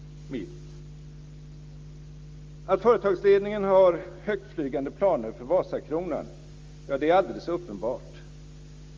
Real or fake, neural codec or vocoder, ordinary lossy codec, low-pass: real; none; Opus, 32 kbps; 7.2 kHz